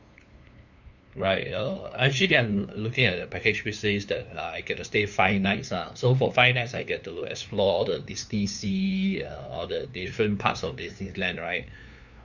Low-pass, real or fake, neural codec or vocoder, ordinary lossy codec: 7.2 kHz; fake; codec, 16 kHz, 2 kbps, FunCodec, trained on LibriTTS, 25 frames a second; none